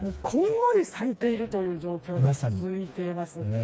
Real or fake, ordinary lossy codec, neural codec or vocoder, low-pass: fake; none; codec, 16 kHz, 2 kbps, FreqCodec, smaller model; none